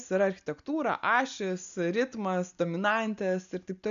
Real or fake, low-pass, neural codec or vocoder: real; 7.2 kHz; none